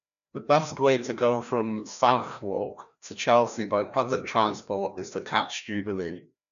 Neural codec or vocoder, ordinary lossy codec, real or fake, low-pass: codec, 16 kHz, 1 kbps, FreqCodec, larger model; none; fake; 7.2 kHz